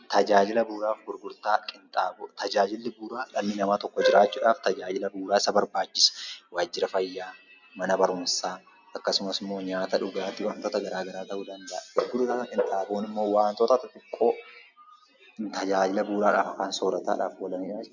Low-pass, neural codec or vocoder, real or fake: 7.2 kHz; none; real